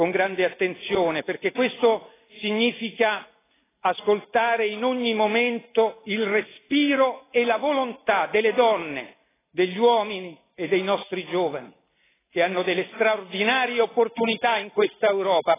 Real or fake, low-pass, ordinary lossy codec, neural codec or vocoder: real; 3.6 kHz; AAC, 16 kbps; none